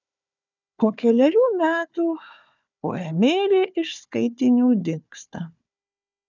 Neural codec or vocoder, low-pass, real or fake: codec, 16 kHz, 4 kbps, FunCodec, trained on Chinese and English, 50 frames a second; 7.2 kHz; fake